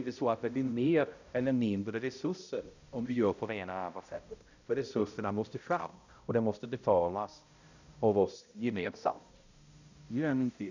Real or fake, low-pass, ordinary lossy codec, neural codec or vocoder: fake; 7.2 kHz; none; codec, 16 kHz, 0.5 kbps, X-Codec, HuBERT features, trained on balanced general audio